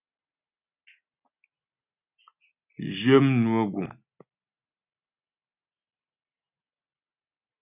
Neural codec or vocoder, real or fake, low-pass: none; real; 3.6 kHz